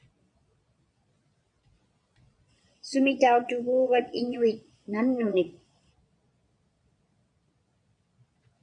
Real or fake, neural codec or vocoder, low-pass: fake; vocoder, 22.05 kHz, 80 mel bands, Vocos; 9.9 kHz